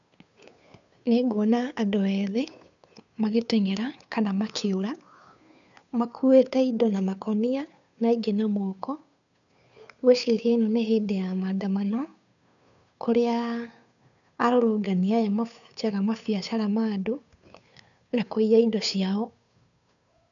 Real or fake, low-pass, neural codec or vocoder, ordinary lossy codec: fake; 7.2 kHz; codec, 16 kHz, 2 kbps, FunCodec, trained on Chinese and English, 25 frames a second; none